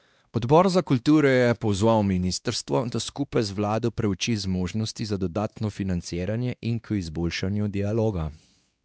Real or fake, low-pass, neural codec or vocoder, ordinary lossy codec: fake; none; codec, 16 kHz, 2 kbps, X-Codec, WavLM features, trained on Multilingual LibriSpeech; none